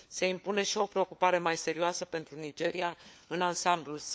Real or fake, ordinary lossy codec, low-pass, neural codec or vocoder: fake; none; none; codec, 16 kHz, 4 kbps, FunCodec, trained on LibriTTS, 50 frames a second